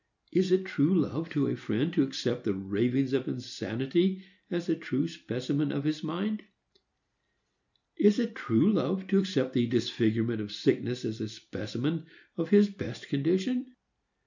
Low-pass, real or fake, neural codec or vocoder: 7.2 kHz; real; none